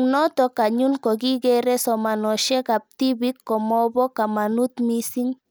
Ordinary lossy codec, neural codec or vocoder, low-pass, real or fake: none; none; none; real